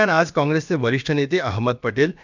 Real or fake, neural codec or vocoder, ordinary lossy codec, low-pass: fake; codec, 16 kHz, about 1 kbps, DyCAST, with the encoder's durations; none; 7.2 kHz